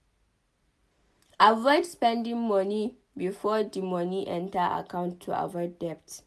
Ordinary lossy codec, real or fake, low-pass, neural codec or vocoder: none; real; none; none